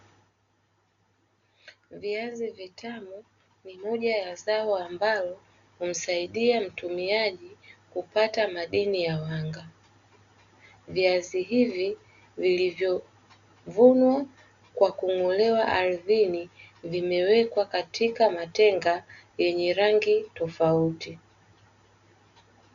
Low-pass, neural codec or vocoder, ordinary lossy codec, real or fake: 7.2 kHz; none; Opus, 64 kbps; real